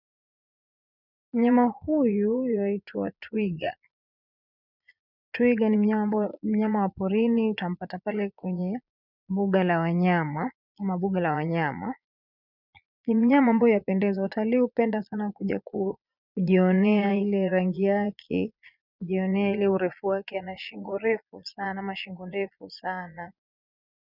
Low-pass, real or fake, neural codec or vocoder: 5.4 kHz; fake; vocoder, 22.05 kHz, 80 mel bands, Vocos